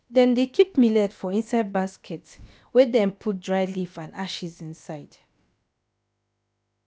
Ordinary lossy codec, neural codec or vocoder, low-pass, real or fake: none; codec, 16 kHz, about 1 kbps, DyCAST, with the encoder's durations; none; fake